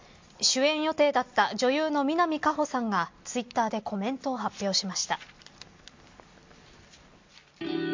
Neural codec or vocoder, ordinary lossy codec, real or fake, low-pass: none; MP3, 48 kbps; real; 7.2 kHz